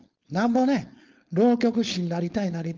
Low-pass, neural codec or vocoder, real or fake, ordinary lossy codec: 7.2 kHz; codec, 16 kHz, 4.8 kbps, FACodec; fake; Opus, 32 kbps